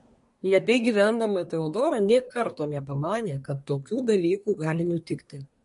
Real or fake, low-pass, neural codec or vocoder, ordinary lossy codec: fake; 10.8 kHz; codec, 24 kHz, 1 kbps, SNAC; MP3, 48 kbps